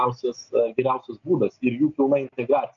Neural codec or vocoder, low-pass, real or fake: none; 7.2 kHz; real